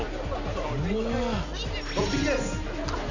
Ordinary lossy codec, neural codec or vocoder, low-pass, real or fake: Opus, 64 kbps; none; 7.2 kHz; real